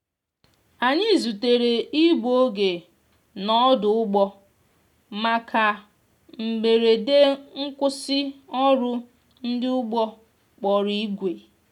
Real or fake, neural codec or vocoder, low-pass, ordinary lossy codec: real; none; 19.8 kHz; none